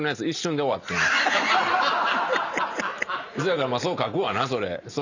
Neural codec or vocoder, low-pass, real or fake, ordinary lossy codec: none; 7.2 kHz; real; none